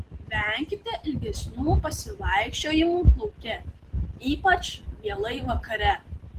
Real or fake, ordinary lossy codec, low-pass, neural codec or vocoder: real; Opus, 16 kbps; 14.4 kHz; none